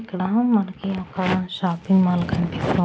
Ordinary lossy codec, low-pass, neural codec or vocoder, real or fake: none; none; none; real